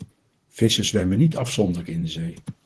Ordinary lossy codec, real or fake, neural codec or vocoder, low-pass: Opus, 16 kbps; fake; vocoder, 44.1 kHz, 128 mel bands, Pupu-Vocoder; 10.8 kHz